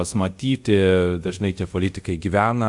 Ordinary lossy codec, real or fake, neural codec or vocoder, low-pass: Opus, 64 kbps; fake; codec, 24 kHz, 0.5 kbps, DualCodec; 10.8 kHz